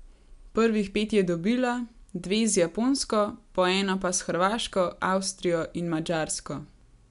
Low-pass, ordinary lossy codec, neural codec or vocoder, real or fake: 10.8 kHz; none; none; real